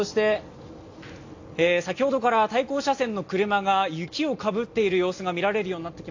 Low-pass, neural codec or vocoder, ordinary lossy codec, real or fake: 7.2 kHz; none; AAC, 48 kbps; real